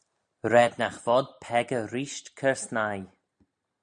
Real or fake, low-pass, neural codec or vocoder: real; 9.9 kHz; none